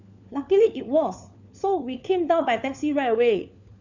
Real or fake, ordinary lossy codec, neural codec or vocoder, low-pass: fake; none; codec, 16 kHz, 4 kbps, FunCodec, trained on LibriTTS, 50 frames a second; 7.2 kHz